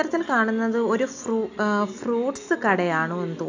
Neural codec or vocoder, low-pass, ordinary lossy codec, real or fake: none; 7.2 kHz; AAC, 48 kbps; real